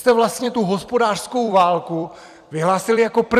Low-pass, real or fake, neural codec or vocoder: 14.4 kHz; fake; vocoder, 44.1 kHz, 128 mel bands every 256 samples, BigVGAN v2